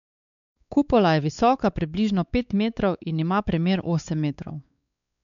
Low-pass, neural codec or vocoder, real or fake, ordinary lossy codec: 7.2 kHz; codec, 16 kHz, 4 kbps, X-Codec, WavLM features, trained on Multilingual LibriSpeech; fake; none